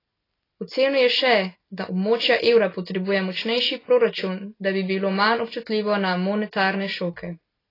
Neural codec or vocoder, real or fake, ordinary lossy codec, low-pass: none; real; AAC, 24 kbps; 5.4 kHz